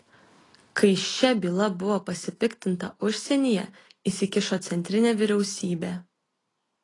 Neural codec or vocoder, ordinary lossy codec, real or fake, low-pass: none; AAC, 32 kbps; real; 10.8 kHz